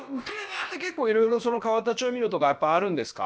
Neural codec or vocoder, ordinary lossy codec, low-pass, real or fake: codec, 16 kHz, about 1 kbps, DyCAST, with the encoder's durations; none; none; fake